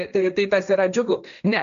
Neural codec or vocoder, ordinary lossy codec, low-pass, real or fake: codec, 16 kHz, 1.1 kbps, Voila-Tokenizer; AAC, 96 kbps; 7.2 kHz; fake